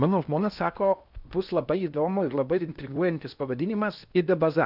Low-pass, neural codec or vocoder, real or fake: 5.4 kHz; codec, 16 kHz in and 24 kHz out, 0.8 kbps, FocalCodec, streaming, 65536 codes; fake